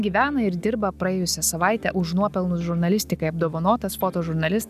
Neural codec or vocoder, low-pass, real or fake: none; 14.4 kHz; real